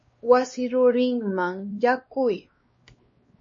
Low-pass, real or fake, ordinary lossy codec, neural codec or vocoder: 7.2 kHz; fake; MP3, 32 kbps; codec, 16 kHz, 2 kbps, X-Codec, WavLM features, trained on Multilingual LibriSpeech